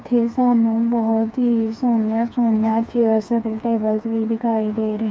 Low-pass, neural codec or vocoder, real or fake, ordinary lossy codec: none; codec, 16 kHz, 4 kbps, FreqCodec, smaller model; fake; none